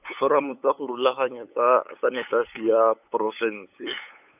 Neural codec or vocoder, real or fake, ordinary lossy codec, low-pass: codec, 16 kHz, 8 kbps, FunCodec, trained on LibriTTS, 25 frames a second; fake; none; 3.6 kHz